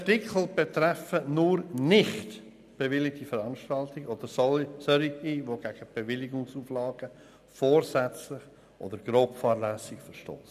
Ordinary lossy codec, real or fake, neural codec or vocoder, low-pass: none; real; none; 14.4 kHz